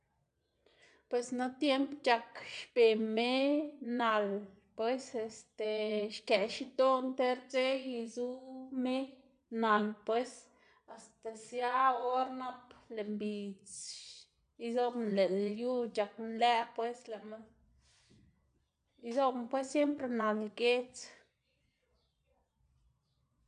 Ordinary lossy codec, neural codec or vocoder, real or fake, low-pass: none; vocoder, 22.05 kHz, 80 mel bands, Vocos; fake; 9.9 kHz